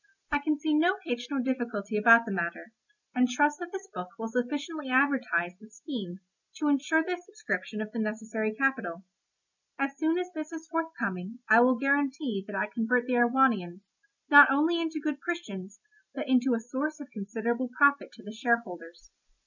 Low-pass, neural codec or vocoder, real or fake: 7.2 kHz; none; real